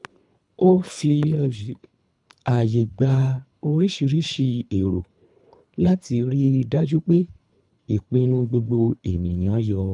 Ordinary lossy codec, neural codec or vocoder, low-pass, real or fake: none; codec, 24 kHz, 3 kbps, HILCodec; 10.8 kHz; fake